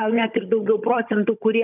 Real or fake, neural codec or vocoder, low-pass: fake; codec, 16 kHz, 16 kbps, FunCodec, trained on Chinese and English, 50 frames a second; 3.6 kHz